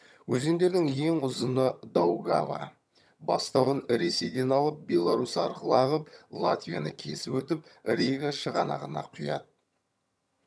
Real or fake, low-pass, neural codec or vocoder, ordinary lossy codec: fake; none; vocoder, 22.05 kHz, 80 mel bands, HiFi-GAN; none